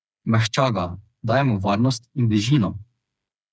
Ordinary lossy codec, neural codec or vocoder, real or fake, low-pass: none; codec, 16 kHz, 4 kbps, FreqCodec, smaller model; fake; none